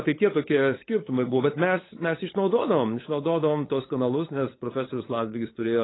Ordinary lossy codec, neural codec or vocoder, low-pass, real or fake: AAC, 16 kbps; codec, 16 kHz, 8 kbps, FunCodec, trained on LibriTTS, 25 frames a second; 7.2 kHz; fake